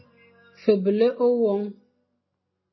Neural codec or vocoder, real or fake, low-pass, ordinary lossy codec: none; real; 7.2 kHz; MP3, 24 kbps